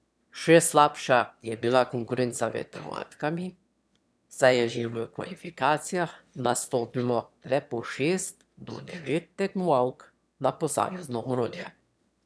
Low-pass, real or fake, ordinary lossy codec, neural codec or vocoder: none; fake; none; autoencoder, 22.05 kHz, a latent of 192 numbers a frame, VITS, trained on one speaker